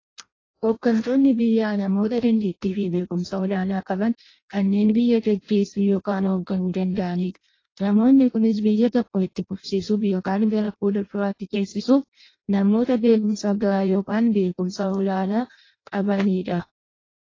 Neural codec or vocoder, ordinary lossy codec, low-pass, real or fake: codec, 16 kHz in and 24 kHz out, 0.6 kbps, FireRedTTS-2 codec; AAC, 32 kbps; 7.2 kHz; fake